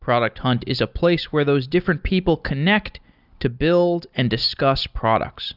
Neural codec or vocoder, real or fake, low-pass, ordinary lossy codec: none; real; 5.4 kHz; Opus, 64 kbps